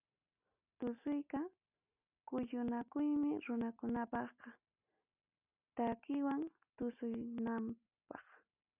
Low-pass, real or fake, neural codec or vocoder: 3.6 kHz; real; none